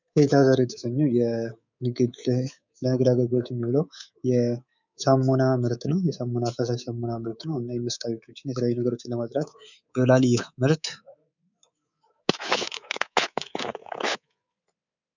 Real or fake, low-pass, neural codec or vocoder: fake; 7.2 kHz; codec, 24 kHz, 3.1 kbps, DualCodec